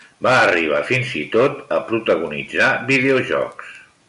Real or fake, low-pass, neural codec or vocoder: real; 10.8 kHz; none